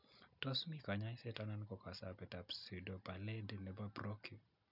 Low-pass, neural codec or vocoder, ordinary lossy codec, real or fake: 5.4 kHz; none; none; real